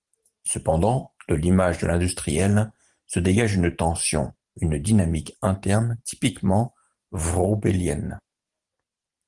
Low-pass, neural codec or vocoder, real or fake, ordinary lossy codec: 10.8 kHz; vocoder, 44.1 kHz, 128 mel bands every 512 samples, BigVGAN v2; fake; Opus, 24 kbps